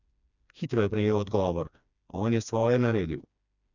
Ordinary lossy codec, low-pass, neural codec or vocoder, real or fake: none; 7.2 kHz; codec, 16 kHz, 2 kbps, FreqCodec, smaller model; fake